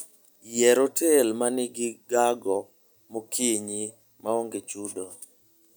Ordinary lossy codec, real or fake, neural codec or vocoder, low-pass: none; real; none; none